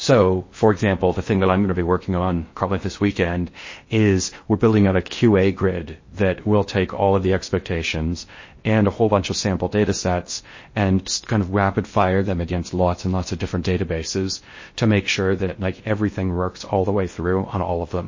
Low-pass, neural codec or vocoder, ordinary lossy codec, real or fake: 7.2 kHz; codec, 16 kHz in and 24 kHz out, 0.6 kbps, FocalCodec, streaming, 4096 codes; MP3, 32 kbps; fake